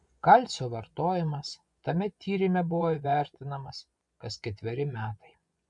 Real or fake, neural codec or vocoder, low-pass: fake; vocoder, 24 kHz, 100 mel bands, Vocos; 10.8 kHz